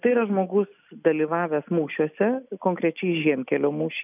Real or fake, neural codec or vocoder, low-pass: real; none; 3.6 kHz